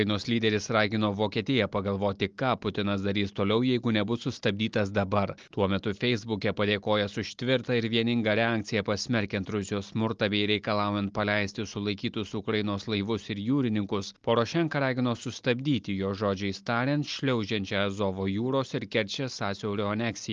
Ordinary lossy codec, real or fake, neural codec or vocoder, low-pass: Opus, 24 kbps; real; none; 7.2 kHz